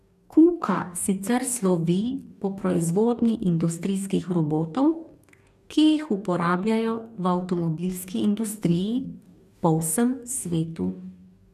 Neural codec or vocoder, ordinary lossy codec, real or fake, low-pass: codec, 44.1 kHz, 2.6 kbps, DAC; none; fake; 14.4 kHz